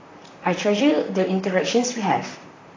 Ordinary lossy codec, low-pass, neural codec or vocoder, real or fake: AAC, 32 kbps; 7.2 kHz; vocoder, 44.1 kHz, 128 mel bands, Pupu-Vocoder; fake